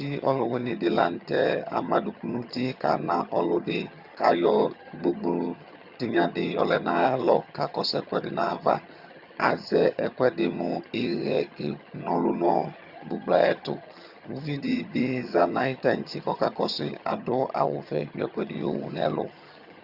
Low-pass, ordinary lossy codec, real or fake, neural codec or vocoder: 5.4 kHz; Opus, 64 kbps; fake; vocoder, 22.05 kHz, 80 mel bands, HiFi-GAN